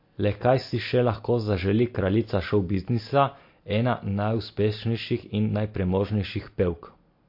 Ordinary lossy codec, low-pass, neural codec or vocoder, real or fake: MP3, 32 kbps; 5.4 kHz; none; real